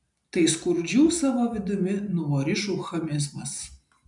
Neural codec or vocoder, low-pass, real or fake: none; 10.8 kHz; real